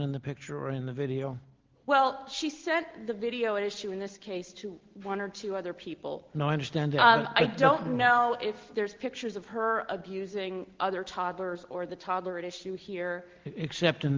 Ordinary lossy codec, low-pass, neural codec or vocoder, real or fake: Opus, 16 kbps; 7.2 kHz; none; real